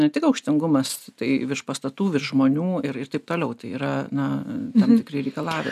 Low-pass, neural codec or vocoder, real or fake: 14.4 kHz; none; real